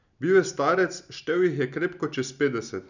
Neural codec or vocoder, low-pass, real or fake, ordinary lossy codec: none; 7.2 kHz; real; none